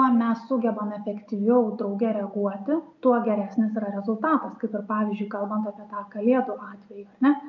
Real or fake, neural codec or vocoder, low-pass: real; none; 7.2 kHz